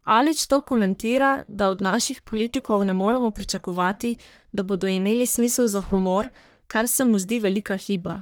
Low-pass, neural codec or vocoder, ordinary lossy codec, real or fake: none; codec, 44.1 kHz, 1.7 kbps, Pupu-Codec; none; fake